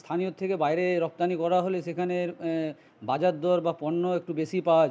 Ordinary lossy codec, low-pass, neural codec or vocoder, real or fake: none; none; none; real